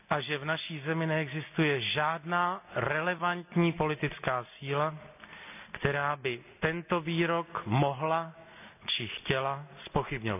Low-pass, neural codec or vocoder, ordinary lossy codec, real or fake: 3.6 kHz; none; none; real